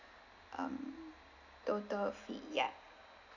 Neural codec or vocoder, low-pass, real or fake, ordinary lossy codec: none; 7.2 kHz; real; none